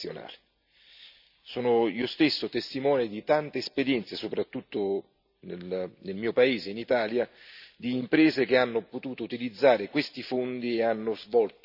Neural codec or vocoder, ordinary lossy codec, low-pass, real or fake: none; none; 5.4 kHz; real